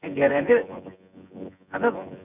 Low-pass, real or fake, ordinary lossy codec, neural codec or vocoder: 3.6 kHz; fake; none; vocoder, 24 kHz, 100 mel bands, Vocos